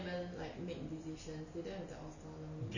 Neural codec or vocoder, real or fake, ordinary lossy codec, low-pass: none; real; none; 7.2 kHz